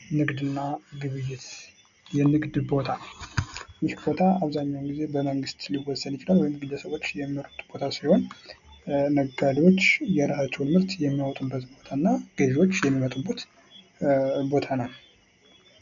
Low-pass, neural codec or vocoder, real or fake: 7.2 kHz; none; real